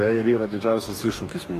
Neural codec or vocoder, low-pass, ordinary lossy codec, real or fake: codec, 44.1 kHz, 2.6 kbps, DAC; 14.4 kHz; AAC, 48 kbps; fake